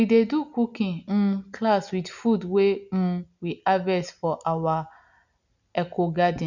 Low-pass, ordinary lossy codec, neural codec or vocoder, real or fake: 7.2 kHz; none; none; real